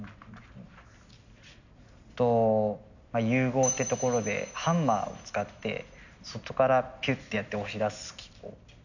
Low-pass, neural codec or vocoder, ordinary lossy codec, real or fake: 7.2 kHz; none; none; real